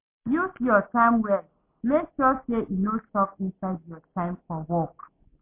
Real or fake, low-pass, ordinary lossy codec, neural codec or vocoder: real; 3.6 kHz; MP3, 32 kbps; none